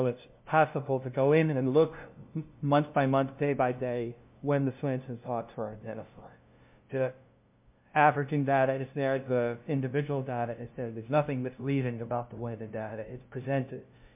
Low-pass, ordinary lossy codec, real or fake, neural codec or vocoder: 3.6 kHz; MP3, 32 kbps; fake; codec, 16 kHz, 0.5 kbps, FunCodec, trained on LibriTTS, 25 frames a second